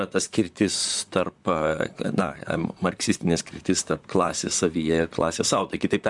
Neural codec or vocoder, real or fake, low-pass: vocoder, 44.1 kHz, 128 mel bands, Pupu-Vocoder; fake; 10.8 kHz